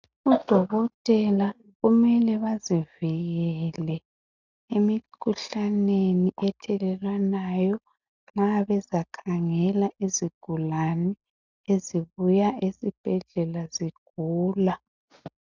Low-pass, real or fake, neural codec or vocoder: 7.2 kHz; real; none